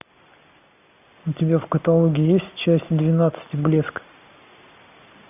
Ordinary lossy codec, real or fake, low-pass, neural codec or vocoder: AAC, 32 kbps; real; 3.6 kHz; none